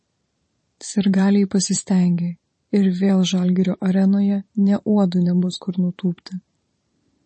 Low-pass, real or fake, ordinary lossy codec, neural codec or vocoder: 10.8 kHz; fake; MP3, 32 kbps; vocoder, 44.1 kHz, 128 mel bands every 512 samples, BigVGAN v2